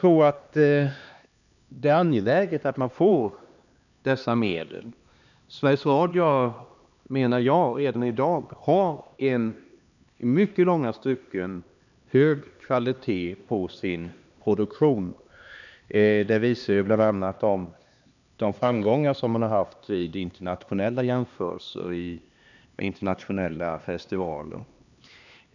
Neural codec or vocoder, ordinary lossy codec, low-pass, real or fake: codec, 16 kHz, 2 kbps, X-Codec, HuBERT features, trained on LibriSpeech; none; 7.2 kHz; fake